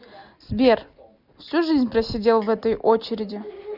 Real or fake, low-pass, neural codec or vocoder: real; 5.4 kHz; none